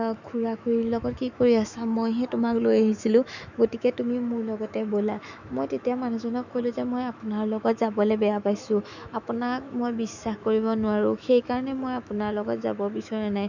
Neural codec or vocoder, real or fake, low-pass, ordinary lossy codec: autoencoder, 48 kHz, 128 numbers a frame, DAC-VAE, trained on Japanese speech; fake; 7.2 kHz; none